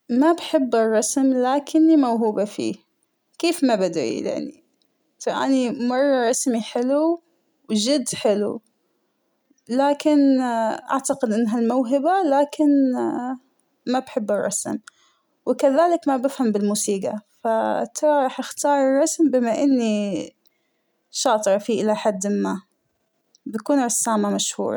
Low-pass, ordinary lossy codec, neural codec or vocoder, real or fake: none; none; none; real